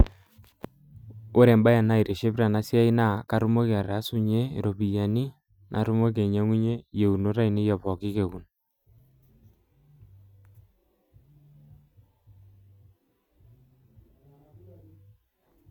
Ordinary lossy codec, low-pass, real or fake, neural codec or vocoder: none; 19.8 kHz; real; none